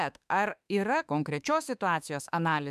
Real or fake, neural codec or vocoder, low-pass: fake; autoencoder, 48 kHz, 32 numbers a frame, DAC-VAE, trained on Japanese speech; 14.4 kHz